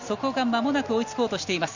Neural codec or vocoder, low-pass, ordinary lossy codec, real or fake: none; 7.2 kHz; none; real